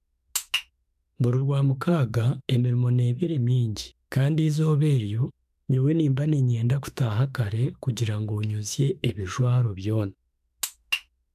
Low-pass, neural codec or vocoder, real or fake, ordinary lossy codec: 14.4 kHz; autoencoder, 48 kHz, 32 numbers a frame, DAC-VAE, trained on Japanese speech; fake; none